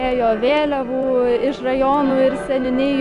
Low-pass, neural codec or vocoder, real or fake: 10.8 kHz; none; real